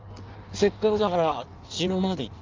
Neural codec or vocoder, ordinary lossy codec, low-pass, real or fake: codec, 16 kHz in and 24 kHz out, 1.1 kbps, FireRedTTS-2 codec; Opus, 24 kbps; 7.2 kHz; fake